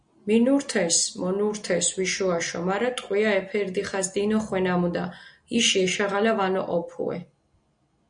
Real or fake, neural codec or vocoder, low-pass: real; none; 9.9 kHz